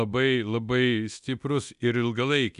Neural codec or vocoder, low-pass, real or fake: codec, 24 kHz, 0.9 kbps, DualCodec; 10.8 kHz; fake